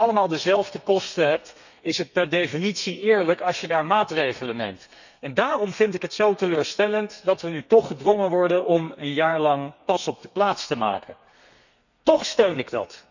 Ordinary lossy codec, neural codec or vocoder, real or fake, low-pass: none; codec, 32 kHz, 1.9 kbps, SNAC; fake; 7.2 kHz